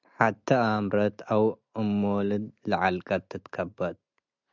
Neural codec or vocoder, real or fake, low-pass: none; real; 7.2 kHz